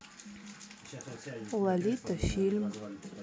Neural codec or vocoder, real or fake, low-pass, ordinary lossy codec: none; real; none; none